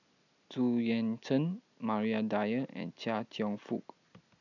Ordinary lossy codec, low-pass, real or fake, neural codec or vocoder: none; 7.2 kHz; real; none